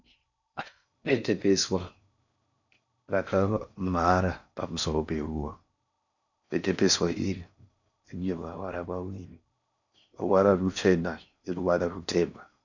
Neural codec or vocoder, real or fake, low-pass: codec, 16 kHz in and 24 kHz out, 0.6 kbps, FocalCodec, streaming, 4096 codes; fake; 7.2 kHz